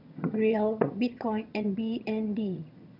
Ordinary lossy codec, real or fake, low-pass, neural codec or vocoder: none; fake; 5.4 kHz; vocoder, 22.05 kHz, 80 mel bands, HiFi-GAN